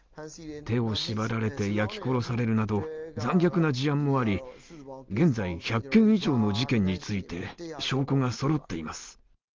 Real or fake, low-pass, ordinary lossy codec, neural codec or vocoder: real; 7.2 kHz; Opus, 32 kbps; none